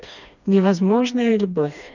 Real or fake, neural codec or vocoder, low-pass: fake; codec, 16 kHz, 2 kbps, FreqCodec, smaller model; 7.2 kHz